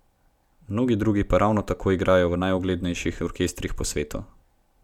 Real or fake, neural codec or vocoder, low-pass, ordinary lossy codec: real; none; 19.8 kHz; none